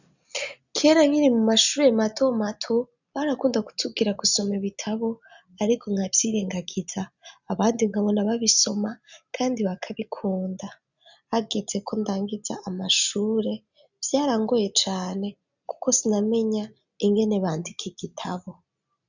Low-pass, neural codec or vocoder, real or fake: 7.2 kHz; none; real